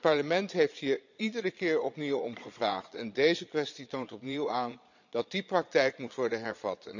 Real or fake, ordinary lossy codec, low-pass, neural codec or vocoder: fake; none; 7.2 kHz; vocoder, 22.05 kHz, 80 mel bands, Vocos